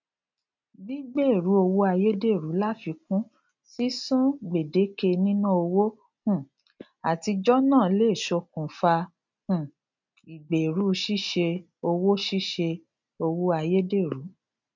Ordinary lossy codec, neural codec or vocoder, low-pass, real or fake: MP3, 64 kbps; none; 7.2 kHz; real